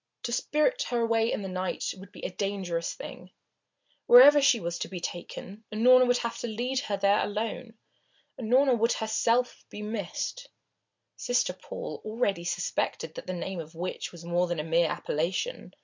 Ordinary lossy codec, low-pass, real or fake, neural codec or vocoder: MP3, 64 kbps; 7.2 kHz; real; none